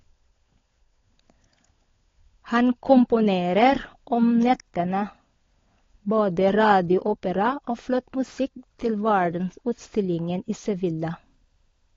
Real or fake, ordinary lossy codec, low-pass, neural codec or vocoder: fake; AAC, 32 kbps; 7.2 kHz; codec, 16 kHz, 16 kbps, FunCodec, trained on LibriTTS, 50 frames a second